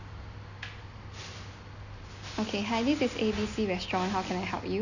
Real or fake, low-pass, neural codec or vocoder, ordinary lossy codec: real; 7.2 kHz; none; none